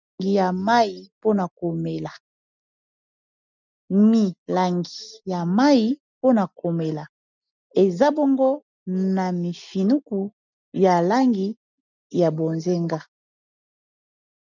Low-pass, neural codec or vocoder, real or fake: 7.2 kHz; none; real